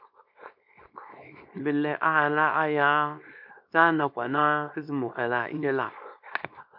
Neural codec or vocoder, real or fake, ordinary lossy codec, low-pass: codec, 24 kHz, 0.9 kbps, WavTokenizer, small release; fake; MP3, 48 kbps; 5.4 kHz